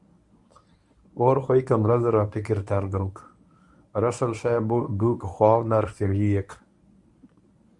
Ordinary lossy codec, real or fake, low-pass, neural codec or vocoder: Opus, 64 kbps; fake; 10.8 kHz; codec, 24 kHz, 0.9 kbps, WavTokenizer, medium speech release version 1